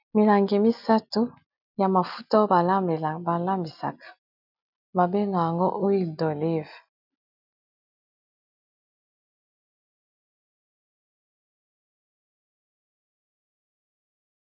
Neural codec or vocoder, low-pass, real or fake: none; 5.4 kHz; real